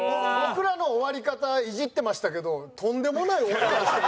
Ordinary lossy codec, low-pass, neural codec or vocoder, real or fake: none; none; none; real